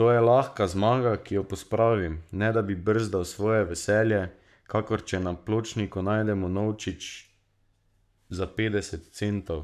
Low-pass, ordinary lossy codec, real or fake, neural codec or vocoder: 14.4 kHz; none; fake; codec, 44.1 kHz, 7.8 kbps, Pupu-Codec